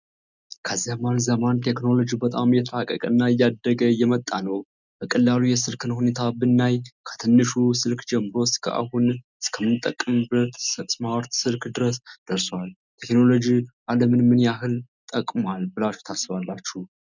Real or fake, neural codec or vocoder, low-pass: real; none; 7.2 kHz